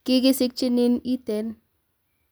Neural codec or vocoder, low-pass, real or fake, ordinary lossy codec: none; none; real; none